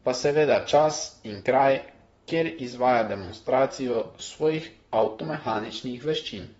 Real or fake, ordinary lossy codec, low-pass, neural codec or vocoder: fake; AAC, 24 kbps; 19.8 kHz; vocoder, 44.1 kHz, 128 mel bands, Pupu-Vocoder